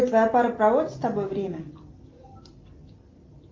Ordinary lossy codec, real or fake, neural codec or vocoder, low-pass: Opus, 32 kbps; real; none; 7.2 kHz